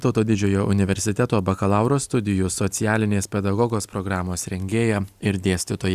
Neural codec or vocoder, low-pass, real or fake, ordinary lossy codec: none; 14.4 kHz; real; Opus, 64 kbps